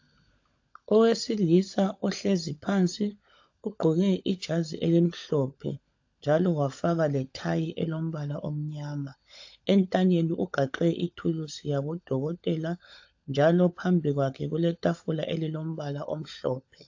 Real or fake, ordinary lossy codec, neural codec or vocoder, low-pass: fake; AAC, 48 kbps; codec, 16 kHz, 16 kbps, FunCodec, trained on LibriTTS, 50 frames a second; 7.2 kHz